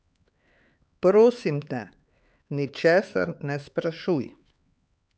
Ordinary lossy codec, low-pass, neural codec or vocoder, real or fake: none; none; codec, 16 kHz, 4 kbps, X-Codec, HuBERT features, trained on LibriSpeech; fake